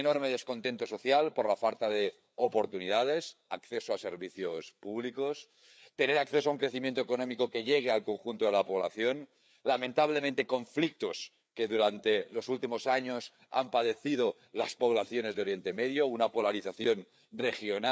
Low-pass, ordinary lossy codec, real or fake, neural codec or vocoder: none; none; fake; codec, 16 kHz, 4 kbps, FreqCodec, larger model